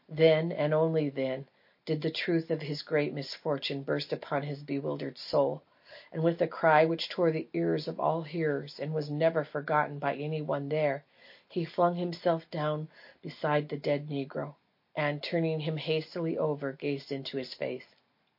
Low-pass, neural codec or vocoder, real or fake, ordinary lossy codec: 5.4 kHz; none; real; MP3, 32 kbps